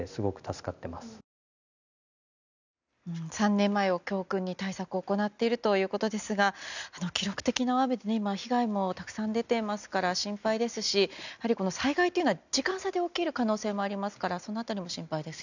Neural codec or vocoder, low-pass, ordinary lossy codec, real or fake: none; 7.2 kHz; none; real